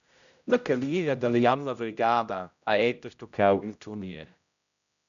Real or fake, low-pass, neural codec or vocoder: fake; 7.2 kHz; codec, 16 kHz, 0.5 kbps, X-Codec, HuBERT features, trained on general audio